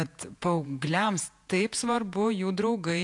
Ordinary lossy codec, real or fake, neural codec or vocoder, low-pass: AAC, 64 kbps; fake; vocoder, 48 kHz, 128 mel bands, Vocos; 10.8 kHz